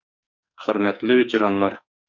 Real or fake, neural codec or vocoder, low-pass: fake; codec, 44.1 kHz, 2.6 kbps, SNAC; 7.2 kHz